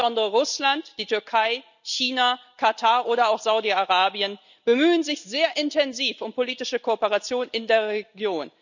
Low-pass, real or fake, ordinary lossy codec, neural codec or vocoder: 7.2 kHz; real; none; none